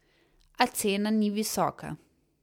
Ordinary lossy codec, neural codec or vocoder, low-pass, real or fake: MP3, 96 kbps; none; 19.8 kHz; real